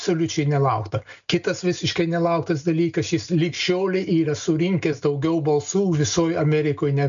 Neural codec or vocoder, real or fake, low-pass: none; real; 7.2 kHz